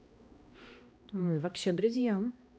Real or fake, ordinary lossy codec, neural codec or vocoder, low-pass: fake; none; codec, 16 kHz, 1 kbps, X-Codec, HuBERT features, trained on balanced general audio; none